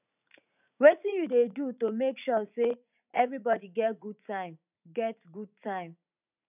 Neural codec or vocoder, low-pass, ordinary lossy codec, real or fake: vocoder, 44.1 kHz, 80 mel bands, Vocos; 3.6 kHz; none; fake